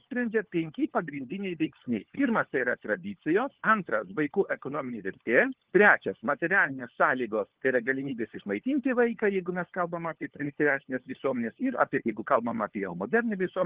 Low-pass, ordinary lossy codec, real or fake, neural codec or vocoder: 3.6 kHz; Opus, 16 kbps; fake; codec, 16 kHz, 4 kbps, FunCodec, trained on LibriTTS, 50 frames a second